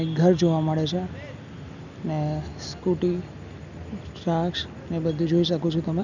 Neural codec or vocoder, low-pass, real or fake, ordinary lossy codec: none; 7.2 kHz; real; none